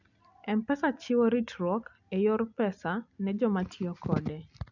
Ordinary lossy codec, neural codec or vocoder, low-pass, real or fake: none; none; 7.2 kHz; real